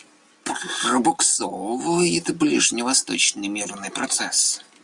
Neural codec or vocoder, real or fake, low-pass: none; real; 10.8 kHz